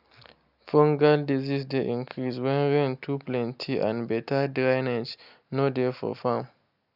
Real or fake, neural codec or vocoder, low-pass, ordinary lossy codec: real; none; 5.4 kHz; none